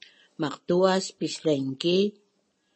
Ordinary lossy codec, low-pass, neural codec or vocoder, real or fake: MP3, 32 kbps; 9.9 kHz; none; real